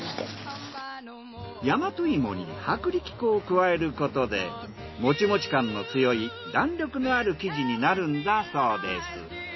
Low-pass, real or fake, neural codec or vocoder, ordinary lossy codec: 7.2 kHz; real; none; MP3, 24 kbps